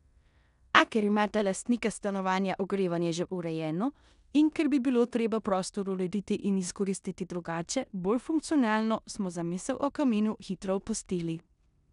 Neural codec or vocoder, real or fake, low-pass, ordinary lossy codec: codec, 16 kHz in and 24 kHz out, 0.9 kbps, LongCat-Audio-Codec, four codebook decoder; fake; 10.8 kHz; none